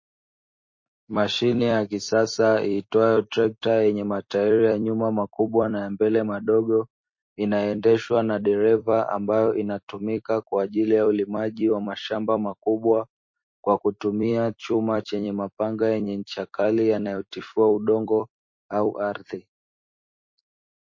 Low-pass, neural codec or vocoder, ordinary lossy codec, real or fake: 7.2 kHz; vocoder, 44.1 kHz, 128 mel bands every 256 samples, BigVGAN v2; MP3, 32 kbps; fake